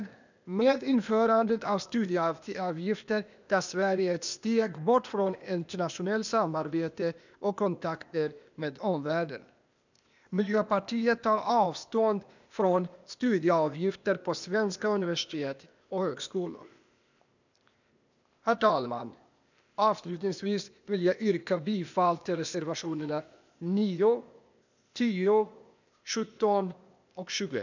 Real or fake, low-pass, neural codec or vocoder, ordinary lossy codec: fake; 7.2 kHz; codec, 16 kHz, 0.8 kbps, ZipCodec; none